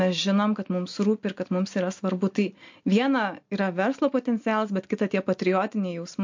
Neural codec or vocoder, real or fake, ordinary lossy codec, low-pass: none; real; MP3, 48 kbps; 7.2 kHz